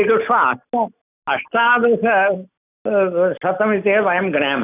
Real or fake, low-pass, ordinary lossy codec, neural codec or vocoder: real; 3.6 kHz; none; none